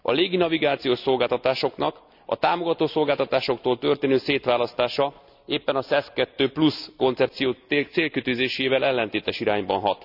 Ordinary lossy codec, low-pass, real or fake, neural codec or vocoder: none; 5.4 kHz; real; none